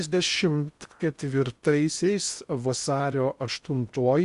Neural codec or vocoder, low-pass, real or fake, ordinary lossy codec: codec, 16 kHz in and 24 kHz out, 0.6 kbps, FocalCodec, streaming, 2048 codes; 10.8 kHz; fake; Opus, 64 kbps